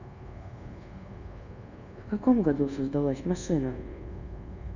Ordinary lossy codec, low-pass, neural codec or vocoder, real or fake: none; 7.2 kHz; codec, 24 kHz, 1.2 kbps, DualCodec; fake